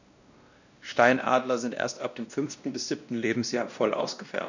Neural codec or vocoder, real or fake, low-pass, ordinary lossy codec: codec, 16 kHz, 1 kbps, X-Codec, WavLM features, trained on Multilingual LibriSpeech; fake; 7.2 kHz; none